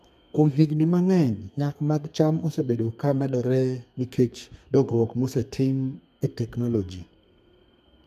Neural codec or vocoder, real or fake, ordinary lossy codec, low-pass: codec, 32 kHz, 1.9 kbps, SNAC; fake; MP3, 96 kbps; 14.4 kHz